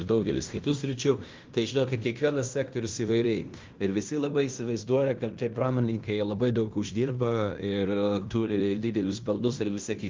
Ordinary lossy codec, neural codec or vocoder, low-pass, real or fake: Opus, 24 kbps; codec, 16 kHz in and 24 kHz out, 0.9 kbps, LongCat-Audio-Codec, fine tuned four codebook decoder; 7.2 kHz; fake